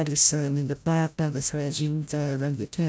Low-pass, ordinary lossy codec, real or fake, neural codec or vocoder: none; none; fake; codec, 16 kHz, 0.5 kbps, FreqCodec, larger model